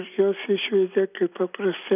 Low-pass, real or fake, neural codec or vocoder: 3.6 kHz; real; none